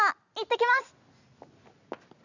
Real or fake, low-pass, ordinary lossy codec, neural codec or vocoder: real; 7.2 kHz; none; none